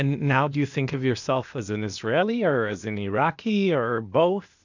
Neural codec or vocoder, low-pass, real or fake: codec, 16 kHz, 0.8 kbps, ZipCodec; 7.2 kHz; fake